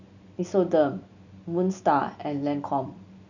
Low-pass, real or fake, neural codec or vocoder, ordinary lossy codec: 7.2 kHz; real; none; none